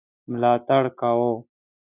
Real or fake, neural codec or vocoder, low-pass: real; none; 3.6 kHz